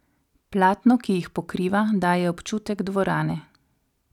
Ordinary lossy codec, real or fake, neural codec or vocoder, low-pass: none; real; none; 19.8 kHz